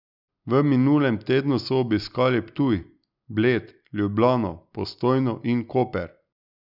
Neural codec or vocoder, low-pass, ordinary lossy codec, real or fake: none; 5.4 kHz; none; real